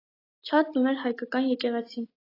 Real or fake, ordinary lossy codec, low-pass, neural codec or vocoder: real; AAC, 24 kbps; 5.4 kHz; none